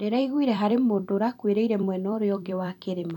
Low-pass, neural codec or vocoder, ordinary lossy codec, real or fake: 19.8 kHz; vocoder, 44.1 kHz, 128 mel bands every 256 samples, BigVGAN v2; none; fake